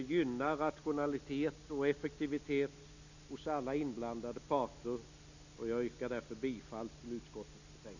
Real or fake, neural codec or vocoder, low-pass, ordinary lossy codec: real; none; 7.2 kHz; none